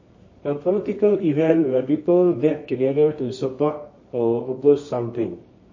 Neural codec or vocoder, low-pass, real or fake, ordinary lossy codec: codec, 24 kHz, 0.9 kbps, WavTokenizer, medium music audio release; 7.2 kHz; fake; MP3, 32 kbps